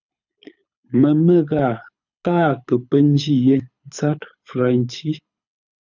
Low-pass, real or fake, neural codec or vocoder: 7.2 kHz; fake; codec, 24 kHz, 6 kbps, HILCodec